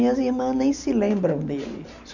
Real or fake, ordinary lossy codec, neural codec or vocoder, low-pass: real; none; none; 7.2 kHz